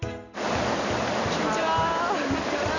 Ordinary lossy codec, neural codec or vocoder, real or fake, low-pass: none; none; real; 7.2 kHz